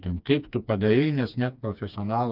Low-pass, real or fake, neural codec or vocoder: 5.4 kHz; fake; codec, 16 kHz, 2 kbps, FreqCodec, smaller model